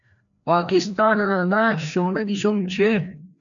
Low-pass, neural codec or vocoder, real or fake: 7.2 kHz; codec, 16 kHz, 1 kbps, FreqCodec, larger model; fake